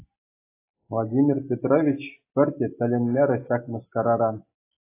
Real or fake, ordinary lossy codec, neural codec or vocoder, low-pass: real; AAC, 24 kbps; none; 3.6 kHz